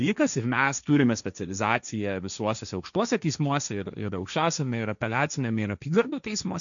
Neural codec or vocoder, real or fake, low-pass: codec, 16 kHz, 1.1 kbps, Voila-Tokenizer; fake; 7.2 kHz